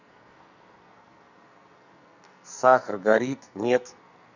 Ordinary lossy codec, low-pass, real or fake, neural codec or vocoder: none; 7.2 kHz; fake; codec, 44.1 kHz, 2.6 kbps, SNAC